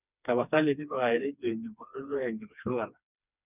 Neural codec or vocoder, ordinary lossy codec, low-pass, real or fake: codec, 16 kHz, 2 kbps, FreqCodec, smaller model; none; 3.6 kHz; fake